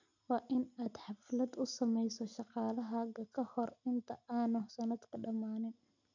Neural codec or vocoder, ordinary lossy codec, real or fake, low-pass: none; none; real; 7.2 kHz